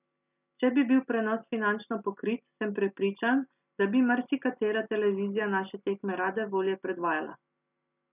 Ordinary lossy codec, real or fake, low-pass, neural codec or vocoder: none; real; 3.6 kHz; none